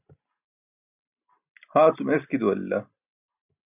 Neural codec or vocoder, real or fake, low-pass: none; real; 3.6 kHz